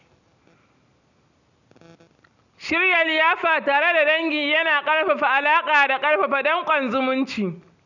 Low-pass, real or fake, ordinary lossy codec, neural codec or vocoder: 7.2 kHz; real; none; none